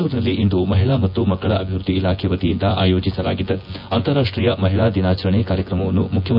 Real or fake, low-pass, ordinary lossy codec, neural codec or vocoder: fake; 5.4 kHz; AAC, 48 kbps; vocoder, 24 kHz, 100 mel bands, Vocos